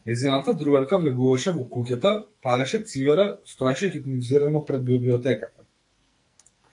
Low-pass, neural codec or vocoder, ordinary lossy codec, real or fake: 10.8 kHz; codec, 44.1 kHz, 2.6 kbps, SNAC; AAC, 64 kbps; fake